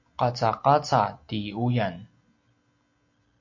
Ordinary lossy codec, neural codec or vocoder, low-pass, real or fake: MP3, 48 kbps; none; 7.2 kHz; real